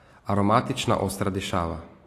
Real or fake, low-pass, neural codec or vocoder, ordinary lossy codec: real; 14.4 kHz; none; AAC, 48 kbps